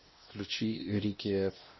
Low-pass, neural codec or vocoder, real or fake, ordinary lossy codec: 7.2 kHz; codec, 16 kHz, 1 kbps, X-Codec, WavLM features, trained on Multilingual LibriSpeech; fake; MP3, 24 kbps